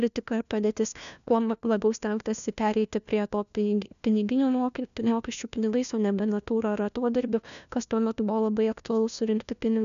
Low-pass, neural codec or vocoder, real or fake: 7.2 kHz; codec, 16 kHz, 1 kbps, FunCodec, trained on LibriTTS, 50 frames a second; fake